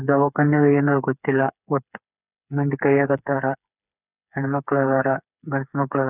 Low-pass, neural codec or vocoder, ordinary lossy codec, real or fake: 3.6 kHz; codec, 16 kHz, 4 kbps, FreqCodec, smaller model; none; fake